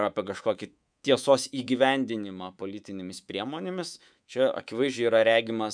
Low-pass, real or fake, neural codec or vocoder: 9.9 kHz; fake; codec, 24 kHz, 3.1 kbps, DualCodec